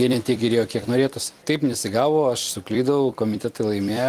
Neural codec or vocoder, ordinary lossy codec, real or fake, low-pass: vocoder, 44.1 kHz, 128 mel bands every 256 samples, BigVGAN v2; Opus, 32 kbps; fake; 14.4 kHz